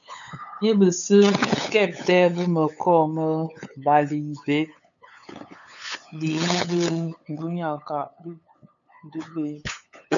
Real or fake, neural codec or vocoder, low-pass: fake; codec, 16 kHz, 8 kbps, FunCodec, trained on LibriTTS, 25 frames a second; 7.2 kHz